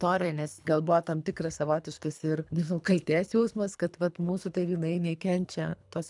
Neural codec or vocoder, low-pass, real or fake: codec, 24 kHz, 3 kbps, HILCodec; 10.8 kHz; fake